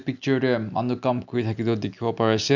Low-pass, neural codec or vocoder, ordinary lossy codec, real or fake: 7.2 kHz; none; none; real